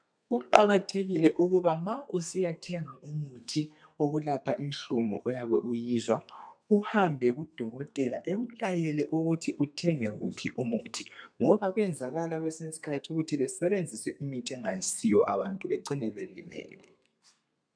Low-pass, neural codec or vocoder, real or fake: 9.9 kHz; codec, 32 kHz, 1.9 kbps, SNAC; fake